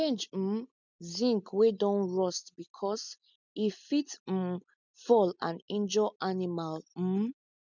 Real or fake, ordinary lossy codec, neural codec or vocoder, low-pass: real; none; none; 7.2 kHz